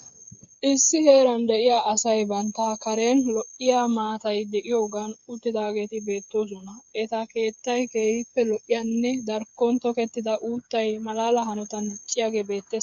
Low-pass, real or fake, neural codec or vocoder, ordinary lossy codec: 7.2 kHz; fake; codec, 16 kHz, 8 kbps, FreqCodec, smaller model; MP3, 64 kbps